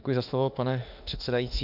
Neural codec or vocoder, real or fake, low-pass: autoencoder, 48 kHz, 32 numbers a frame, DAC-VAE, trained on Japanese speech; fake; 5.4 kHz